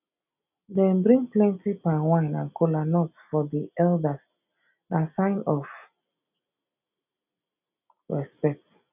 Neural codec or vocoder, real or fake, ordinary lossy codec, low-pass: none; real; none; 3.6 kHz